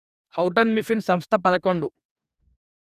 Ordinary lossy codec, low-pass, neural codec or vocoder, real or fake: none; 14.4 kHz; codec, 44.1 kHz, 2.6 kbps, DAC; fake